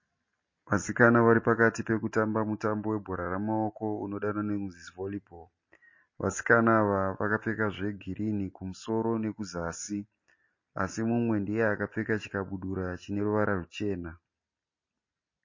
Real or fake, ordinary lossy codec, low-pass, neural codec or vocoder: real; MP3, 32 kbps; 7.2 kHz; none